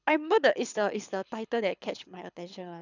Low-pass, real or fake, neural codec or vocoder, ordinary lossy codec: 7.2 kHz; fake; codec, 24 kHz, 6 kbps, HILCodec; none